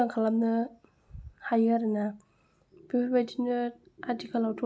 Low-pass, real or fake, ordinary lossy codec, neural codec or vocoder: none; real; none; none